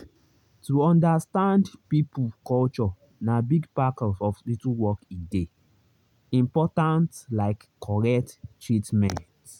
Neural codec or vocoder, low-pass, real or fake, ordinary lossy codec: none; 19.8 kHz; real; none